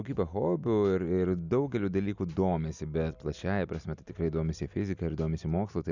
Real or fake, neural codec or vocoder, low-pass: real; none; 7.2 kHz